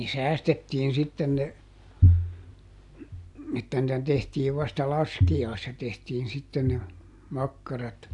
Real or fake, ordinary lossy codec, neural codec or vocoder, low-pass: real; AAC, 64 kbps; none; 10.8 kHz